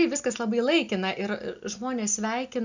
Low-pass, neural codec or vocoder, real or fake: 7.2 kHz; none; real